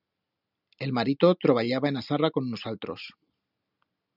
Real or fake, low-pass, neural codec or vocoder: real; 5.4 kHz; none